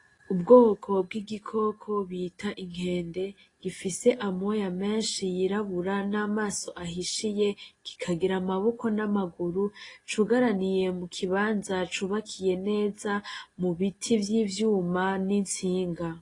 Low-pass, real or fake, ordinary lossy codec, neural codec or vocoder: 10.8 kHz; real; AAC, 32 kbps; none